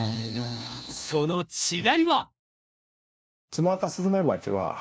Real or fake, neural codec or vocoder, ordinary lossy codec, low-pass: fake; codec, 16 kHz, 1 kbps, FunCodec, trained on LibriTTS, 50 frames a second; none; none